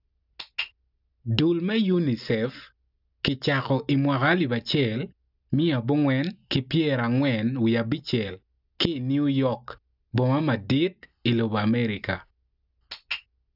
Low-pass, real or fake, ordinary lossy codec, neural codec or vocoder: 5.4 kHz; real; none; none